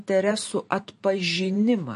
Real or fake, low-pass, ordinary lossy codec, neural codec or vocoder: fake; 14.4 kHz; MP3, 48 kbps; vocoder, 44.1 kHz, 128 mel bands every 512 samples, BigVGAN v2